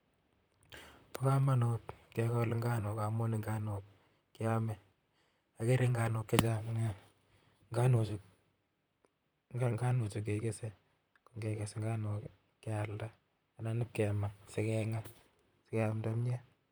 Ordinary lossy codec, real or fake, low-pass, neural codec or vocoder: none; fake; none; vocoder, 44.1 kHz, 128 mel bands, Pupu-Vocoder